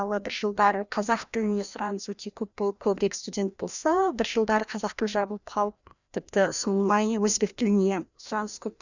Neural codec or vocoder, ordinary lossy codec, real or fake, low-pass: codec, 16 kHz, 1 kbps, FreqCodec, larger model; none; fake; 7.2 kHz